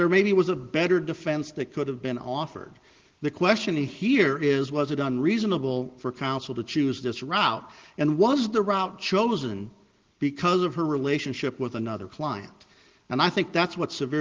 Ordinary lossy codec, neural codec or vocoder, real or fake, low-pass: Opus, 16 kbps; none; real; 7.2 kHz